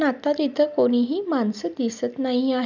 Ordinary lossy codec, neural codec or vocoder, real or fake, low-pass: none; none; real; 7.2 kHz